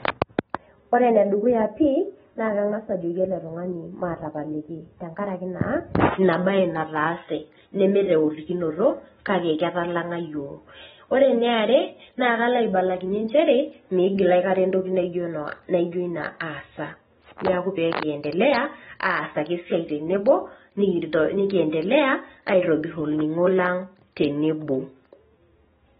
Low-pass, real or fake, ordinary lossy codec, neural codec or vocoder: 19.8 kHz; real; AAC, 16 kbps; none